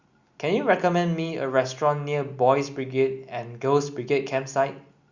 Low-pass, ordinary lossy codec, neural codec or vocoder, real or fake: 7.2 kHz; Opus, 64 kbps; none; real